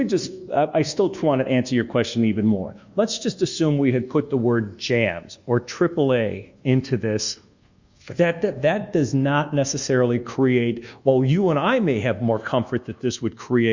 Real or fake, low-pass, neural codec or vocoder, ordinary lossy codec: fake; 7.2 kHz; codec, 24 kHz, 1.2 kbps, DualCodec; Opus, 64 kbps